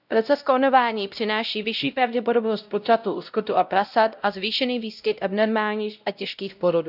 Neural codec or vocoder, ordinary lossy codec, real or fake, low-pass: codec, 16 kHz, 0.5 kbps, X-Codec, WavLM features, trained on Multilingual LibriSpeech; none; fake; 5.4 kHz